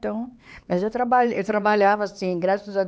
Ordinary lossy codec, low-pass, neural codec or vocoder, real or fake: none; none; codec, 16 kHz, 4 kbps, X-Codec, HuBERT features, trained on LibriSpeech; fake